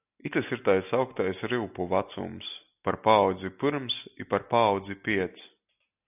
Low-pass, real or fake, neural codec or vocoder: 3.6 kHz; real; none